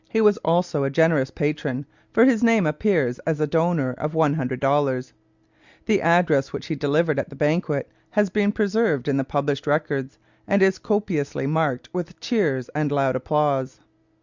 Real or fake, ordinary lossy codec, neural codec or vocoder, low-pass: real; Opus, 64 kbps; none; 7.2 kHz